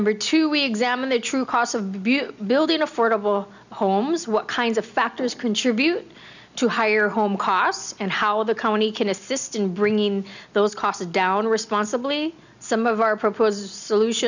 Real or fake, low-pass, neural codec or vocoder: real; 7.2 kHz; none